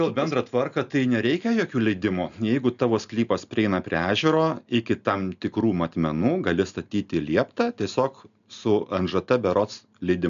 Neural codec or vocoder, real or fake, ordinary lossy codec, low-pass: none; real; MP3, 96 kbps; 7.2 kHz